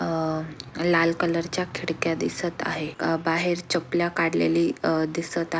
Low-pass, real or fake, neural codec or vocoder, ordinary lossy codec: none; real; none; none